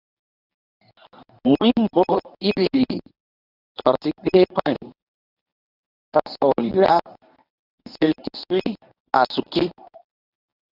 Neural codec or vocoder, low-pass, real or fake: codec, 24 kHz, 0.9 kbps, WavTokenizer, medium speech release version 1; 5.4 kHz; fake